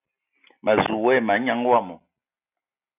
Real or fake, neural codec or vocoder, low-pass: real; none; 3.6 kHz